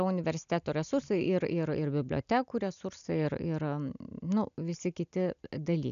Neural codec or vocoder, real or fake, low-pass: none; real; 7.2 kHz